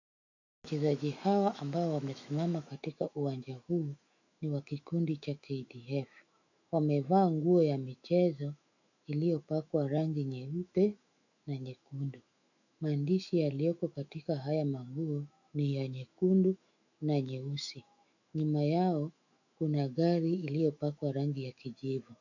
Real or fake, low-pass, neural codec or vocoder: fake; 7.2 kHz; autoencoder, 48 kHz, 128 numbers a frame, DAC-VAE, trained on Japanese speech